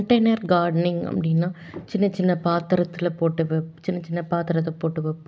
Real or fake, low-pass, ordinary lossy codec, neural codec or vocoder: real; none; none; none